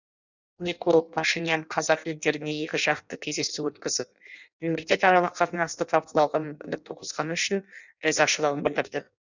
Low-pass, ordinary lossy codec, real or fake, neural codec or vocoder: 7.2 kHz; none; fake; codec, 16 kHz in and 24 kHz out, 0.6 kbps, FireRedTTS-2 codec